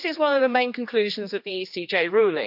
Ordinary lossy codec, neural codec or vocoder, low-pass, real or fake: none; codec, 16 kHz, 2 kbps, X-Codec, HuBERT features, trained on general audio; 5.4 kHz; fake